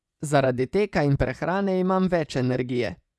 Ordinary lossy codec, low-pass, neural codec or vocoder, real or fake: none; none; vocoder, 24 kHz, 100 mel bands, Vocos; fake